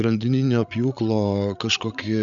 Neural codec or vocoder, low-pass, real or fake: codec, 16 kHz, 8 kbps, FreqCodec, larger model; 7.2 kHz; fake